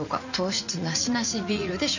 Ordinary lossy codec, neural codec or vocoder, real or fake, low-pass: none; vocoder, 44.1 kHz, 80 mel bands, Vocos; fake; 7.2 kHz